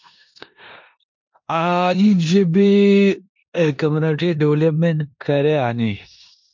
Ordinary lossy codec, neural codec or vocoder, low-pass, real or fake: MP3, 48 kbps; codec, 16 kHz in and 24 kHz out, 0.9 kbps, LongCat-Audio-Codec, four codebook decoder; 7.2 kHz; fake